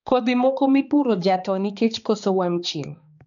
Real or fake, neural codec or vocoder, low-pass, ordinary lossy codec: fake; codec, 16 kHz, 2 kbps, X-Codec, HuBERT features, trained on balanced general audio; 7.2 kHz; none